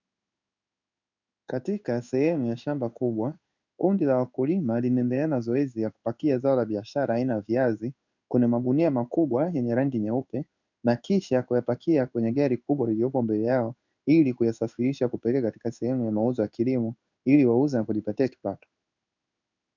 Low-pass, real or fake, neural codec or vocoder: 7.2 kHz; fake; codec, 16 kHz in and 24 kHz out, 1 kbps, XY-Tokenizer